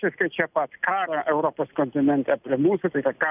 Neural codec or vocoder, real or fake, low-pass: none; real; 3.6 kHz